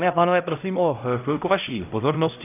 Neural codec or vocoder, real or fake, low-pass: codec, 16 kHz, 0.5 kbps, X-Codec, HuBERT features, trained on LibriSpeech; fake; 3.6 kHz